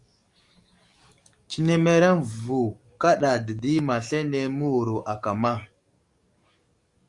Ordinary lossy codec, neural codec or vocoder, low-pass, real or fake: Opus, 64 kbps; codec, 44.1 kHz, 7.8 kbps, DAC; 10.8 kHz; fake